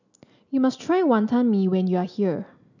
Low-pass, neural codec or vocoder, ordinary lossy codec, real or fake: 7.2 kHz; none; none; real